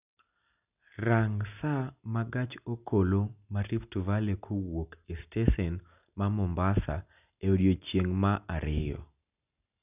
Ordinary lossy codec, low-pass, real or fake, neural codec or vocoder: none; 3.6 kHz; real; none